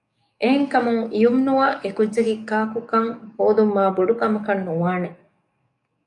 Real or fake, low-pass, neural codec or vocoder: fake; 10.8 kHz; codec, 44.1 kHz, 7.8 kbps, DAC